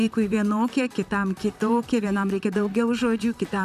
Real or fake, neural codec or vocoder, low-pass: fake; vocoder, 44.1 kHz, 128 mel bands, Pupu-Vocoder; 14.4 kHz